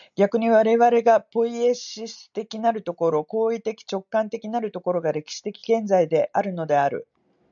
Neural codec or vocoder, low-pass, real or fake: none; 7.2 kHz; real